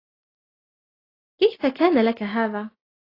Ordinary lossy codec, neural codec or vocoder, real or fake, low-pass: AAC, 24 kbps; none; real; 5.4 kHz